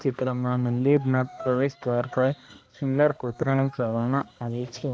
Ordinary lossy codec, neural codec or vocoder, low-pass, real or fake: none; codec, 16 kHz, 1 kbps, X-Codec, HuBERT features, trained on balanced general audio; none; fake